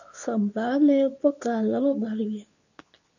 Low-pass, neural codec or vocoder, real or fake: 7.2 kHz; codec, 24 kHz, 0.9 kbps, WavTokenizer, medium speech release version 2; fake